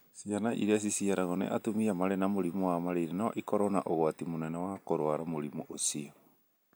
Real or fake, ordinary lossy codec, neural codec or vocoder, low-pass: real; none; none; none